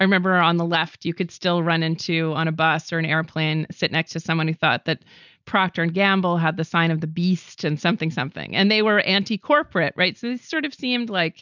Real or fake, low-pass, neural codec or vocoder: real; 7.2 kHz; none